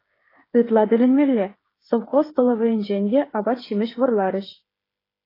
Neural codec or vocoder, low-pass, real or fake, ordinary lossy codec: codec, 16 kHz, 8 kbps, FreqCodec, smaller model; 5.4 kHz; fake; AAC, 24 kbps